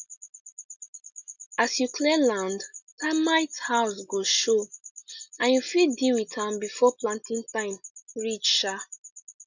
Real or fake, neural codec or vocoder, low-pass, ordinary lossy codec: real; none; 7.2 kHz; none